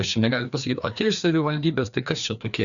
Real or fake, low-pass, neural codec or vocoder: fake; 7.2 kHz; codec, 16 kHz, 2 kbps, FreqCodec, larger model